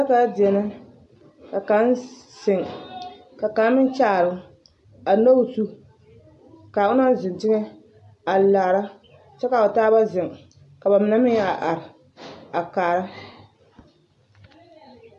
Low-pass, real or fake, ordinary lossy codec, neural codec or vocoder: 10.8 kHz; real; AAC, 64 kbps; none